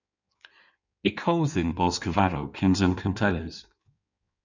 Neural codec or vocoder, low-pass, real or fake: codec, 16 kHz in and 24 kHz out, 1.1 kbps, FireRedTTS-2 codec; 7.2 kHz; fake